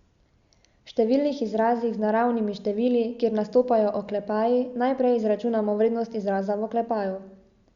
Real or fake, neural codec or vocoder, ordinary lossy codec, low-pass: real; none; Opus, 64 kbps; 7.2 kHz